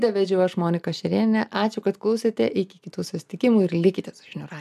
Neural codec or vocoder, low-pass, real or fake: none; 14.4 kHz; real